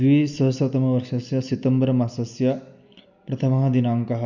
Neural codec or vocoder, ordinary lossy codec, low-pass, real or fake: none; none; 7.2 kHz; real